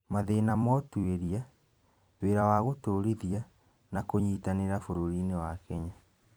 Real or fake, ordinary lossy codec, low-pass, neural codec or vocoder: fake; none; none; vocoder, 44.1 kHz, 128 mel bands every 256 samples, BigVGAN v2